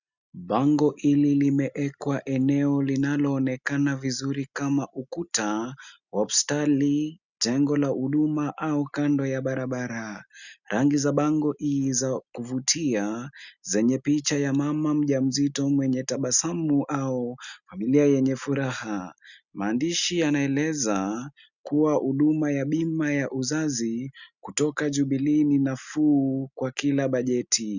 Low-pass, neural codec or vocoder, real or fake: 7.2 kHz; none; real